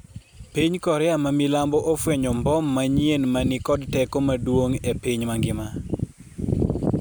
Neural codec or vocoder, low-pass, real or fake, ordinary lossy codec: none; none; real; none